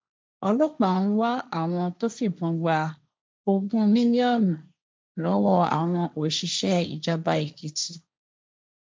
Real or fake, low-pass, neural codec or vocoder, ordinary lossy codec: fake; none; codec, 16 kHz, 1.1 kbps, Voila-Tokenizer; none